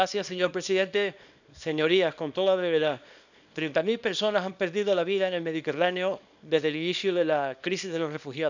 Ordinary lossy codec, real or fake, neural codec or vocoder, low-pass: none; fake; codec, 24 kHz, 0.9 kbps, WavTokenizer, small release; 7.2 kHz